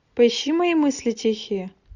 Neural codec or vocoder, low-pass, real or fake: none; 7.2 kHz; real